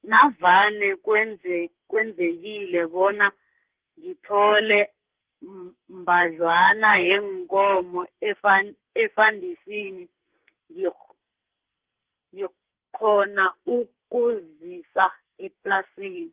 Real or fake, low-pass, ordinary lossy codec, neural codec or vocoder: fake; 3.6 kHz; Opus, 16 kbps; codec, 44.1 kHz, 2.6 kbps, SNAC